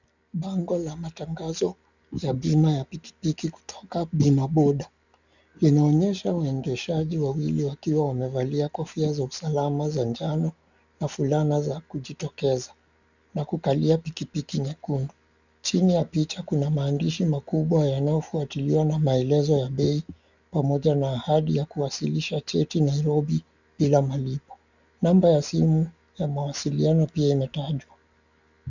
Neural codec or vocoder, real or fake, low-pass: none; real; 7.2 kHz